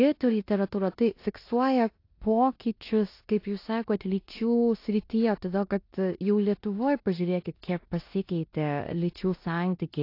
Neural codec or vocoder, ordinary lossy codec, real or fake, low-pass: codec, 16 kHz in and 24 kHz out, 0.9 kbps, LongCat-Audio-Codec, four codebook decoder; AAC, 32 kbps; fake; 5.4 kHz